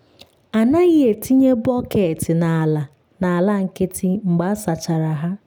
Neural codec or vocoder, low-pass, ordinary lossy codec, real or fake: none; none; none; real